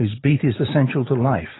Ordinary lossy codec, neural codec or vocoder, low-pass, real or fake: AAC, 16 kbps; none; 7.2 kHz; real